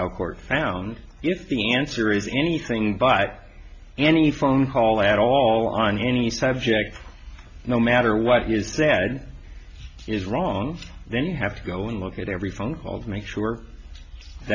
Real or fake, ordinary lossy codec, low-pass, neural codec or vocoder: real; MP3, 64 kbps; 7.2 kHz; none